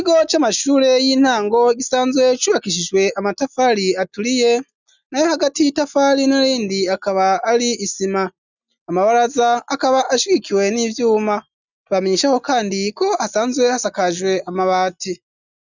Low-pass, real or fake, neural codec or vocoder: 7.2 kHz; real; none